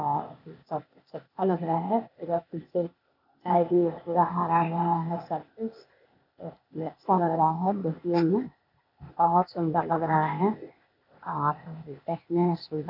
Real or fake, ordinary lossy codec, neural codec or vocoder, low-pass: fake; none; codec, 16 kHz, 0.8 kbps, ZipCodec; 5.4 kHz